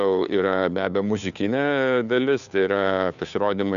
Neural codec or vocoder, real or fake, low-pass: codec, 16 kHz, 2 kbps, FunCodec, trained on Chinese and English, 25 frames a second; fake; 7.2 kHz